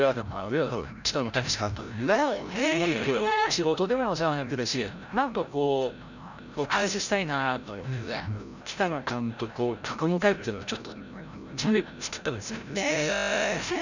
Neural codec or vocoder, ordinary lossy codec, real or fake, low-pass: codec, 16 kHz, 0.5 kbps, FreqCodec, larger model; none; fake; 7.2 kHz